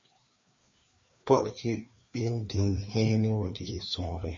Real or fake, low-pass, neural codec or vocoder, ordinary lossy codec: fake; 7.2 kHz; codec, 16 kHz, 2 kbps, FreqCodec, larger model; MP3, 32 kbps